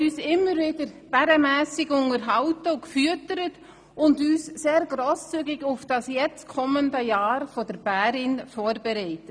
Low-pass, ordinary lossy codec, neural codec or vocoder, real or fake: 9.9 kHz; none; none; real